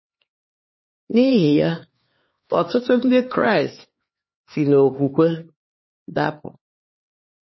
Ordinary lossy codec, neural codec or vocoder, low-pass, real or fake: MP3, 24 kbps; codec, 16 kHz, 4 kbps, X-Codec, HuBERT features, trained on LibriSpeech; 7.2 kHz; fake